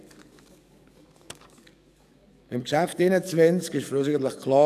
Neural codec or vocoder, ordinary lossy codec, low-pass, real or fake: codec, 44.1 kHz, 7.8 kbps, DAC; none; 14.4 kHz; fake